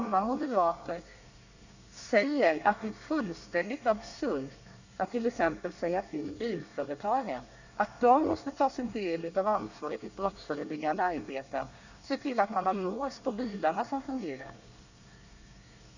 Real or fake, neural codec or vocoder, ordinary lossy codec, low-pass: fake; codec, 24 kHz, 1 kbps, SNAC; none; 7.2 kHz